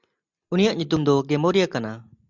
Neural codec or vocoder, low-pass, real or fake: none; 7.2 kHz; real